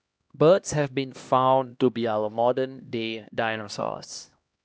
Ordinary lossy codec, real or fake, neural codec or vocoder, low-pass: none; fake; codec, 16 kHz, 1 kbps, X-Codec, HuBERT features, trained on LibriSpeech; none